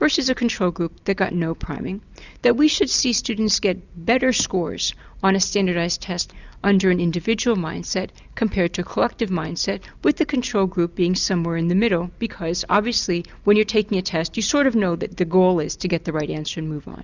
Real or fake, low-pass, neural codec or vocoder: real; 7.2 kHz; none